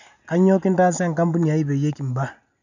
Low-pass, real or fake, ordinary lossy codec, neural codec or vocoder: 7.2 kHz; real; none; none